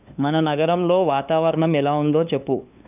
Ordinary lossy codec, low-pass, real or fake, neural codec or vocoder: none; 3.6 kHz; fake; codec, 16 kHz, 2 kbps, FunCodec, trained on LibriTTS, 25 frames a second